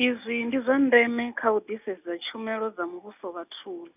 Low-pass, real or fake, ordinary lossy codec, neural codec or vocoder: 3.6 kHz; real; none; none